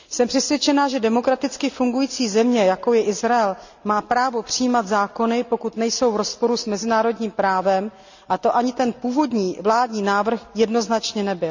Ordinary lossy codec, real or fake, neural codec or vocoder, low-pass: none; real; none; 7.2 kHz